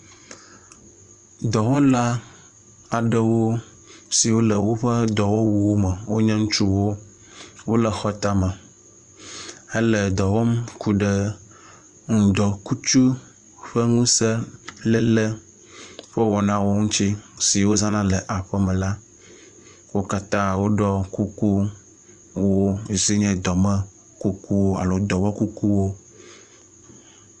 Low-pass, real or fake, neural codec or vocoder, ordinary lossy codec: 10.8 kHz; fake; vocoder, 24 kHz, 100 mel bands, Vocos; Opus, 64 kbps